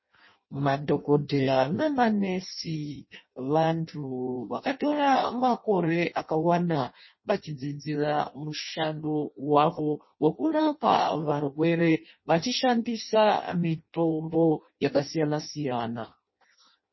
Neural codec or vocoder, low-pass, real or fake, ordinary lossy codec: codec, 16 kHz in and 24 kHz out, 0.6 kbps, FireRedTTS-2 codec; 7.2 kHz; fake; MP3, 24 kbps